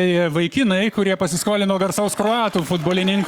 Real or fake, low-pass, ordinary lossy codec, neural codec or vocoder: fake; 19.8 kHz; Opus, 64 kbps; codec, 44.1 kHz, 7.8 kbps, Pupu-Codec